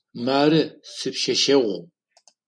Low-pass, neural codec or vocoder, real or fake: 9.9 kHz; none; real